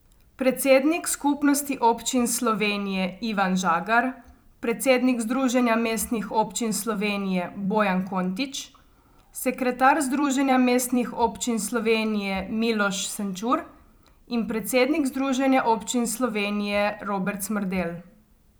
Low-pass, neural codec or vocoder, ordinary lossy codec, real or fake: none; vocoder, 44.1 kHz, 128 mel bands every 256 samples, BigVGAN v2; none; fake